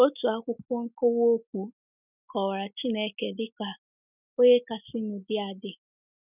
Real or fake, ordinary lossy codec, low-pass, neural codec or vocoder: real; none; 3.6 kHz; none